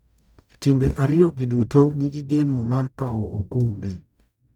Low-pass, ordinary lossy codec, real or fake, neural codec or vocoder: 19.8 kHz; none; fake; codec, 44.1 kHz, 0.9 kbps, DAC